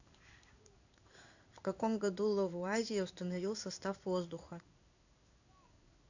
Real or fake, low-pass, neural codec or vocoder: fake; 7.2 kHz; codec, 16 kHz in and 24 kHz out, 1 kbps, XY-Tokenizer